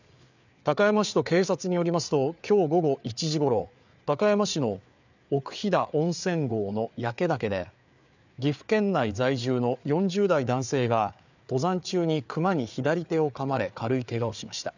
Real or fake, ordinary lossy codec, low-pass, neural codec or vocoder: fake; none; 7.2 kHz; codec, 16 kHz, 4 kbps, FreqCodec, larger model